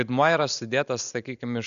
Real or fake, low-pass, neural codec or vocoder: real; 7.2 kHz; none